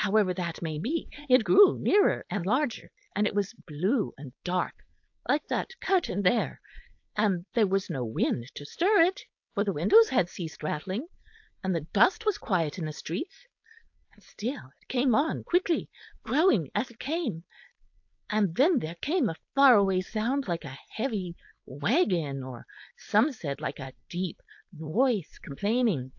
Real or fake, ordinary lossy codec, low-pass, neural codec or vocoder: fake; AAC, 48 kbps; 7.2 kHz; codec, 16 kHz, 4.8 kbps, FACodec